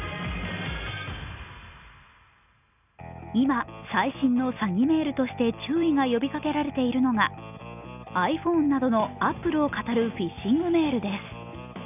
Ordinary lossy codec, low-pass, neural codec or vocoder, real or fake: none; 3.6 kHz; none; real